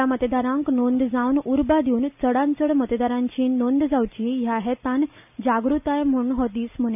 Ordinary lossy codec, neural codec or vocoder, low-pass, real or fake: none; none; 3.6 kHz; real